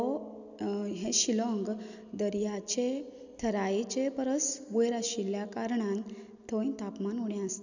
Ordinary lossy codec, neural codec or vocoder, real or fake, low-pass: none; none; real; 7.2 kHz